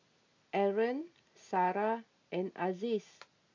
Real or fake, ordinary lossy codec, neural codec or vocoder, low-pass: real; MP3, 48 kbps; none; 7.2 kHz